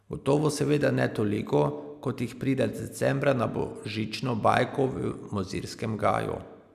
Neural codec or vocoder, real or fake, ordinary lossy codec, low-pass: none; real; none; 14.4 kHz